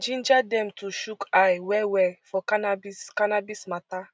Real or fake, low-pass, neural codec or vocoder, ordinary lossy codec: real; none; none; none